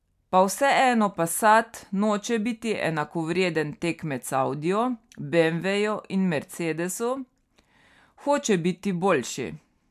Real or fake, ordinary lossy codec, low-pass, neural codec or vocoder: real; MP3, 96 kbps; 14.4 kHz; none